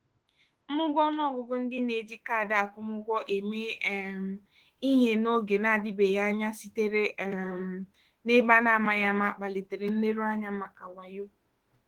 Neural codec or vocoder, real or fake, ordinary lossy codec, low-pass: autoencoder, 48 kHz, 32 numbers a frame, DAC-VAE, trained on Japanese speech; fake; Opus, 16 kbps; 19.8 kHz